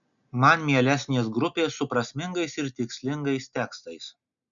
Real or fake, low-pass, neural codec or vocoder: real; 7.2 kHz; none